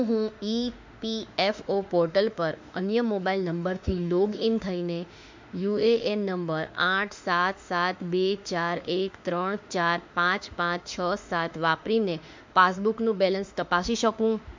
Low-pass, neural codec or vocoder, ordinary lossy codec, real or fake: 7.2 kHz; autoencoder, 48 kHz, 32 numbers a frame, DAC-VAE, trained on Japanese speech; MP3, 64 kbps; fake